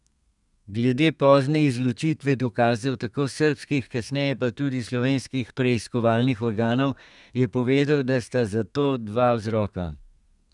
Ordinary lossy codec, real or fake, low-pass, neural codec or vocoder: none; fake; 10.8 kHz; codec, 32 kHz, 1.9 kbps, SNAC